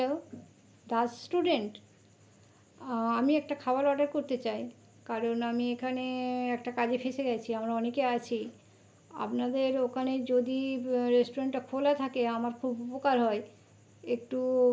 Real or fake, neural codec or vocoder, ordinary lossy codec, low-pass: real; none; none; none